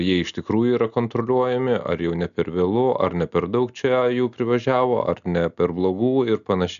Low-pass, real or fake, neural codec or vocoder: 7.2 kHz; real; none